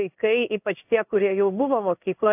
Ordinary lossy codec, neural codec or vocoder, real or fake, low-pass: AAC, 32 kbps; codec, 16 kHz in and 24 kHz out, 1 kbps, XY-Tokenizer; fake; 3.6 kHz